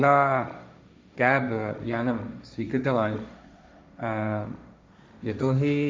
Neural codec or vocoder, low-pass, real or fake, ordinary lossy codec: codec, 16 kHz, 1.1 kbps, Voila-Tokenizer; none; fake; none